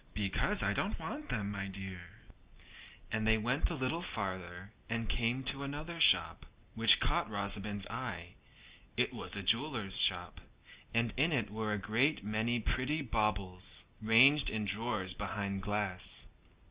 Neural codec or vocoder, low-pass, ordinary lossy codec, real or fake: none; 3.6 kHz; Opus, 64 kbps; real